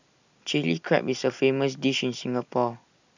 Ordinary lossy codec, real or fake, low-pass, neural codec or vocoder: none; real; 7.2 kHz; none